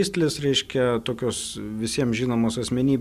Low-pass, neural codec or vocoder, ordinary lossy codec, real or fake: 14.4 kHz; none; Opus, 64 kbps; real